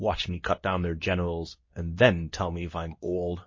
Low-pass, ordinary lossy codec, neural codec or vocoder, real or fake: 7.2 kHz; MP3, 32 kbps; codec, 24 kHz, 0.9 kbps, WavTokenizer, medium speech release version 2; fake